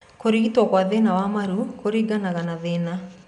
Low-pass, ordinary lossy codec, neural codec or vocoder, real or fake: 10.8 kHz; none; none; real